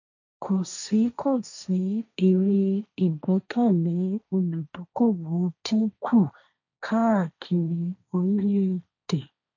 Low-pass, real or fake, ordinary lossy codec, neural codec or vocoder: 7.2 kHz; fake; none; codec, 16 kHz, 1.1 kbps, Voila-Tokenizer